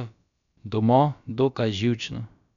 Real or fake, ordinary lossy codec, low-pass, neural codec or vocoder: fake; none; 7.2 kHz; codec, 16 kHz, about 1 kbps, DyCAST, with the encoder's durations